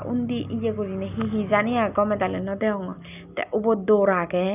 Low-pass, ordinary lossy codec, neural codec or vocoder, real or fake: 3.6 kHz; none; none; real